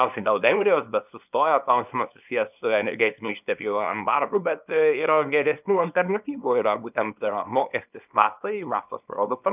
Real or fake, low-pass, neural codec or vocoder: fake; 3.6 kHz; codec, 24 kHz, 0.9 kbps, WavTokenizer, small release